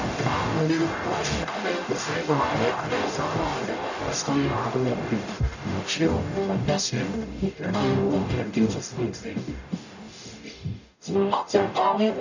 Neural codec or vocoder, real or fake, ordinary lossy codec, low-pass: codec, 44.1 kHz, 0.9 kbps, DAC; fake; none; 7.2 kHz